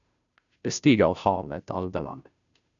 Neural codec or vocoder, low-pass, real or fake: codec, 16 kHz, 0.5 kbps, FunCodec, trained on Chinese and English, 25 frames a second; 7.2 kHz; fake